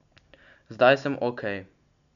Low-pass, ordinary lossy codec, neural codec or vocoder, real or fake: 7.2 kHz; none; none; real